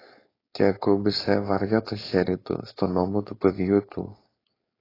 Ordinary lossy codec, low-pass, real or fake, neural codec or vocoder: AAC, 24 kbps; 5.4 kHz; fake; codec, 16 kHz, 4.8 kbps, FACodec